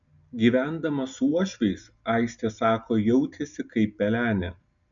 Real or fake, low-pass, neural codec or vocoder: real; 7.2 kHz; none